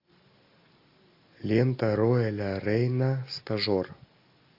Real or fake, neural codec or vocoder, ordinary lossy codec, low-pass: real; none; AAC, 32 kbps; 5.4 kHz